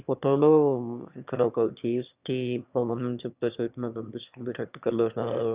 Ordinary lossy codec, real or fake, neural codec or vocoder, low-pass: Opus, 64 kbps; fake; autoencoder, 22.05 kHz, a latent of 192 numbers a frame, VITS, trained on one speaker; 3.6 kHz